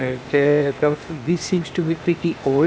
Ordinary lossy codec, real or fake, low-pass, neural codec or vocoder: none; fake; none; codec, 16 kHz, 0.8 kbps, ZipCodec